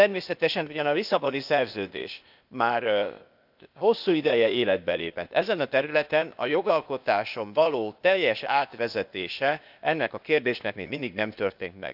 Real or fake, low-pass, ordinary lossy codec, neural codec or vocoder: fake; 5.4 kHz; none; codec, 16 kHz, 0.8 kbps, ZipCodec